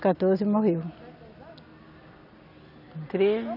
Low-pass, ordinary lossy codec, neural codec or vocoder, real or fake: 5.4 kHz; none; none; real